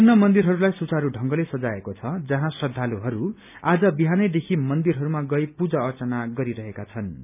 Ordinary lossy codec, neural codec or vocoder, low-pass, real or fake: none; none; 3.6 kHz; real